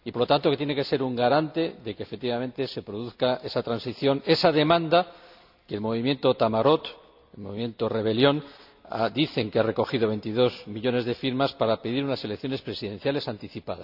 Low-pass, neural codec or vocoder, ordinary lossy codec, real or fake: 5.4 kHz; none; none; real